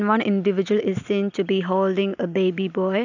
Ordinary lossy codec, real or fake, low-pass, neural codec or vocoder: none; real; 7.2 kHz; none